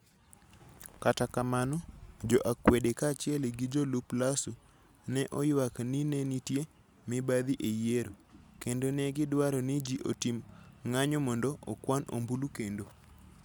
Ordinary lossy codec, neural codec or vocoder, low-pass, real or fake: none; none; none; real